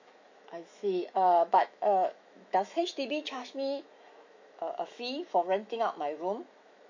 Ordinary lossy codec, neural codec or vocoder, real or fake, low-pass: AAC, 48 kbps; autoencoder, 48 kHz, 128 numbers a frame, DAC-VAE, trained on Japanese speech; fake; 7.2 kHz